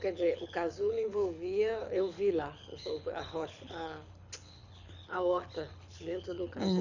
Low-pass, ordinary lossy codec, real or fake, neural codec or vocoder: 7.2 kHz; none; fake; codec, 24 kHz, 6 kbps, HILCodec